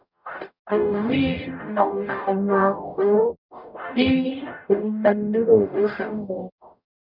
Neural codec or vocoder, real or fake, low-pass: codec, 44.1 kHz, 0.9 kbps, DAC; fake; 5.4 kHz